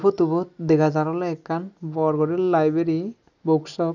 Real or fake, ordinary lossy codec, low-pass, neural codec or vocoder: real; none; 7.2 kHz; none